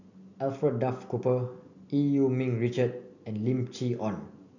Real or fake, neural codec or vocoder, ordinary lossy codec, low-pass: real; none; none; 7.2 kHz